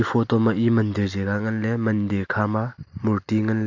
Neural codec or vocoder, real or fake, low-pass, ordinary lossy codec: none; real; 7.2 kHz; AAC, 48 kbps